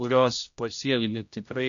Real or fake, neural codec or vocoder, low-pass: fake; codec, 16 kHz, 0.5 kbps, X-Codec, HuBERT features, trained on general audio; 7.2 kHz